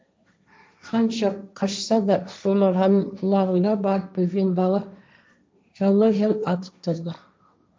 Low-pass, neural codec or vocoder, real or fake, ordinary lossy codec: none; codec, 16 kHz, 1.1 kbps, Voila-Tokenizer; fake; none